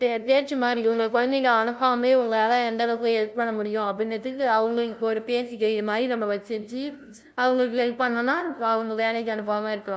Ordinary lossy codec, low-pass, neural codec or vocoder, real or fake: none; none; codec, 16 kHz, 0.5 kbps, FunCodec, trained on LibriTTS, 25 frames a second; fake